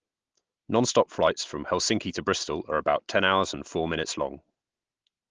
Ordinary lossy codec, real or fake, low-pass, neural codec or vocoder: Opus, 16 kbps; real; 7.2 kHz; none